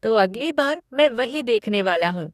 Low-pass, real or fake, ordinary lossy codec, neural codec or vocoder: 14.4 kHz; fake; none; codec, 44.1 kHz, 2.6 kbps, DAC